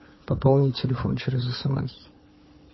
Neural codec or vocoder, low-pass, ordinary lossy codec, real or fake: codec, 16 kHz, 4 kbps, FunCodec, trained on Chinese and English, 50 frames a second; 7.2 kHz; MP3, 24 kbps; fake